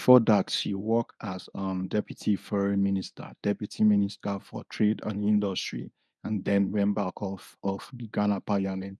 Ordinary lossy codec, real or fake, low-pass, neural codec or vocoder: none; fake; none; codec, 24 kHz, 0.9 kbps, WavTokenizer, medium speech release version 1